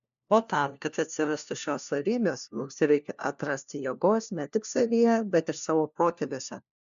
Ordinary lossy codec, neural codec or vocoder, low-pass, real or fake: AAC, 96 kbps; codec, 16 kHz, 1 kbps, FunCodec, trained on LibriTTS, 50 frames a second; 7.2 kHz; fake